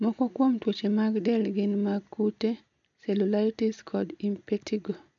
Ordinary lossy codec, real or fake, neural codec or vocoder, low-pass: none; real; none; 7.2 kHz